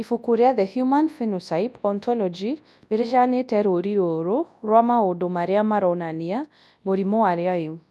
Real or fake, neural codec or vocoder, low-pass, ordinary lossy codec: fake; codec, 24 kHz, 0.9 kbps, WavTokenizer, large speech release; none; none